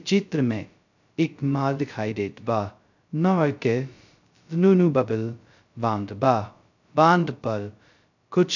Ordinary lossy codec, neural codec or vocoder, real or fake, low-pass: none; codec, 16 kHz, 0.2 kbps, FocalCodec; fake; 7.2 kHz